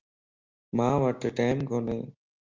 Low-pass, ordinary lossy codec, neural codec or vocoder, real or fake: 7.2 kHz; Opus, 32 kbps; none; real